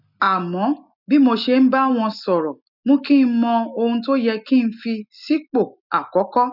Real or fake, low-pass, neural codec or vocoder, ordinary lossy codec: real; 5.4 kHz; none; none